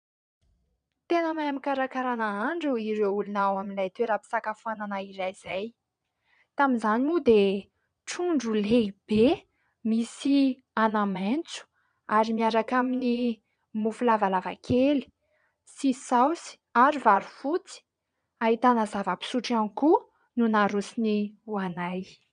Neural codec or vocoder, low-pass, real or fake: vocoder, 22.05 kHz, 80 mel bands, Vocos; 9.9 kHz; fake